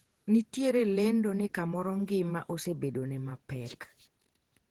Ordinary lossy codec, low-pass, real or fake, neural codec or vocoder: Opus, 16 kbps; 19.8 kHz; fake; vocoder, 48 kHz, 128 mel bands, Vocos